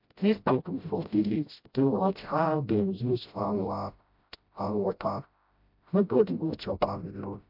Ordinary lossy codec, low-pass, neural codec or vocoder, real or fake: MP3, 48 kbps; 5.4 kHz; codec, 16 kHz, 0.5 kbps, FreqCodec, smaller model; fake